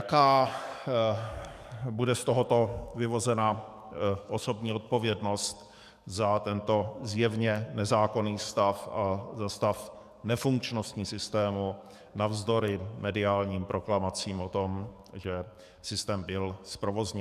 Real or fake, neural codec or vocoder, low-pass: fake; codec, 44.1 kHz, 7.8 kbps, DAC; 14.4 kHz